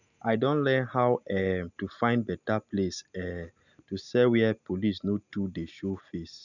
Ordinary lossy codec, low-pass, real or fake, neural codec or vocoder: none; 7.2 kHz; real; none